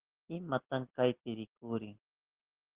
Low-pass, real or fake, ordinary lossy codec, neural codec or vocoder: 3.6 kHz; real; Opus, 16 kbps; none